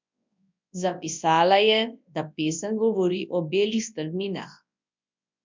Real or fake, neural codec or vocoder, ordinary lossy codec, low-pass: fake; codec, 24 kHz, 0.9 kbps, WavTokenizer, large speech release; MP3, 64 kbps; 7.2 kHz